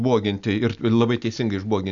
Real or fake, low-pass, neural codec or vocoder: real; 7.2 kHz; none